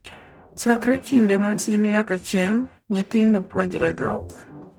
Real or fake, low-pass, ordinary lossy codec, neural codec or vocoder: fake; none; none; codec, 44.1 kHz, 0.9 kbps, DAC